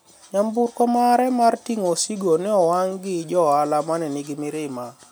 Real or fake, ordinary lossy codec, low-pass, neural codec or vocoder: real; none; none; none